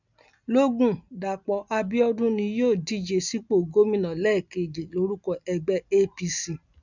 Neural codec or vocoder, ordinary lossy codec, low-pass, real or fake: none; none; 7.2 kHz; real